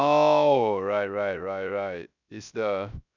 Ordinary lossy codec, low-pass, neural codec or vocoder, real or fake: none; 7.2 kHz; codec, 16 kHz, 0.3 kbps, FocalCodec; fake